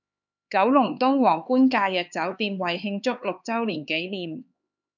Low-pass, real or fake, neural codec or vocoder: 7.2 kHz; fake; codec, 16 kHz, 4 kbps, X-Codec, HuBERT features, trained on LibriSpeech